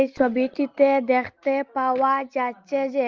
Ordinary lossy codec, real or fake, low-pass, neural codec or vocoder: Opus, 24 kbps; real; 7.2 kHz; none